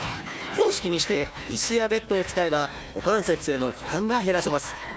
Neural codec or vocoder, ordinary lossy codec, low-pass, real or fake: codec, 16 kHz, 1 kbps, FunCodec, trained on Chinese and English, 50 frames a second; none; none; fake